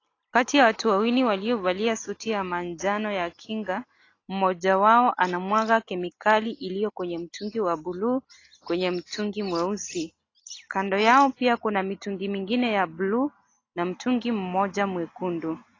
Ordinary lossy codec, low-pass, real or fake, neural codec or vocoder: AAC, 32 kbps; 7.2 kHz; real; none